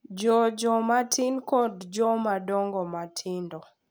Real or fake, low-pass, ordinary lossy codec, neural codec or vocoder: real; none; none; none